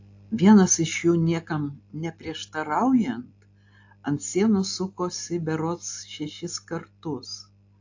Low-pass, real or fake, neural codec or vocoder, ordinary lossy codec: 7.2 kHz; real; none; AAC, 48 kbps